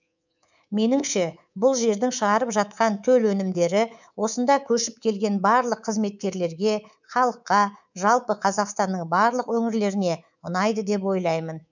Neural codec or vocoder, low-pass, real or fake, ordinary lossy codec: codec, 24 kHz, 3.1 kbps, DualCodec; 7.2 kHz; fake; none